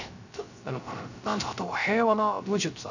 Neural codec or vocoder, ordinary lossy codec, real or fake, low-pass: codec, 16 kHz, 0.3 kbps, FocalCodec; none; fake; 7.2 kHz